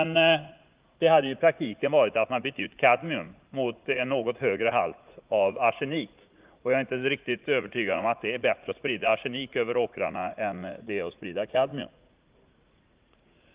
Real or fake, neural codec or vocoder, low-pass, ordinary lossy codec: fake; vocoder, 44.1 kHz, 80 mel bands, Vocos; 3.6 kHz; Opus, 32 kbps